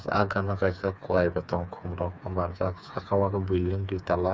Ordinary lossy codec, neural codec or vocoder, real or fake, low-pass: none; codec, 16 kHz, 4 kbps, FreqCodec, smaller model; fake; none